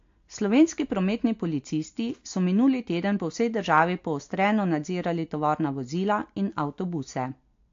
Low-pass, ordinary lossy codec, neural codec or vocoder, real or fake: 7.2 kHz; AAC, 48 kbps; none; real